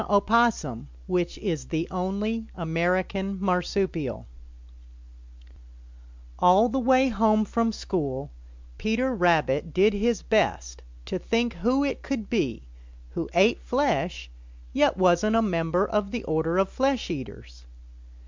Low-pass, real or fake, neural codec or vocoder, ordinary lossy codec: 7.2 kHz; real; none; MP3, 64 kbps